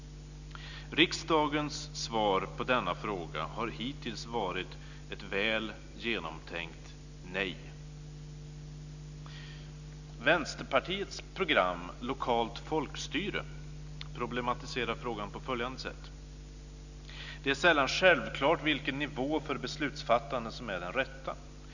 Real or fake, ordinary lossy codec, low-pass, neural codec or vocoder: real; none; 7.2 kHz; none